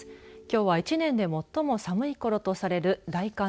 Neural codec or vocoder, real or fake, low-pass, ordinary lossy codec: none; real; none; none